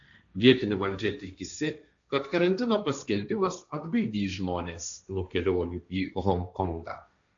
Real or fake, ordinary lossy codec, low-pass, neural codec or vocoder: fake; AAC, 64 kbps; 7.2 kHz; codec, 16 kHz, 1.1 kbps, Voila-Tokenizer